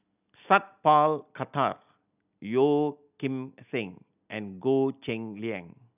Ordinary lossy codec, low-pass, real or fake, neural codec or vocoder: none; 3.6 kHz; real; none